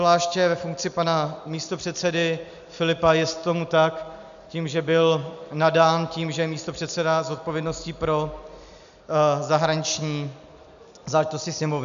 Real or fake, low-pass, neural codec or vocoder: real; 7.2 kHz; none